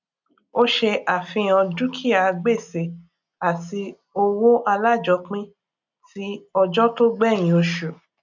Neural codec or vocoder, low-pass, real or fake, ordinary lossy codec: none; 7.2 kHz; real; none